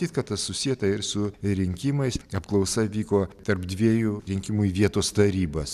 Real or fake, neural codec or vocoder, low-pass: real; none; 14.4 kHz